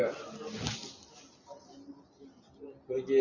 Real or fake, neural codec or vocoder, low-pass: real; none; 7.2 kHz